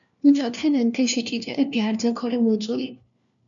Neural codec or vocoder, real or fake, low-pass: codec, 16 kHz, 1 kbps, FunCodec, trained on LibriTTS, 50 frames a second; fake; 7.2 kHz